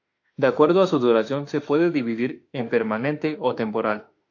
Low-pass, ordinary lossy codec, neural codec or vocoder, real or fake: 7.2 kHz; AAC, 48 kbps; autoencoder, 48 kHz, 32 numbers a frame, DAC-VAE, trained on Japanese speech; fake